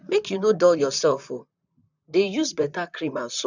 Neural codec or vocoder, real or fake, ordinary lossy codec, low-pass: vocoder, 44.1 kHz, 128 mel bands, Pupu-Vocoder; fake; none; 7.2 kHz